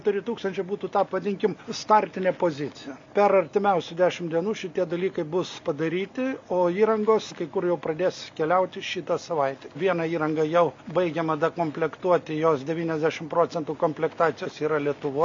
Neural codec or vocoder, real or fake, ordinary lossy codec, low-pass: none; real; MP3, 48 kbps; 7.2 kHz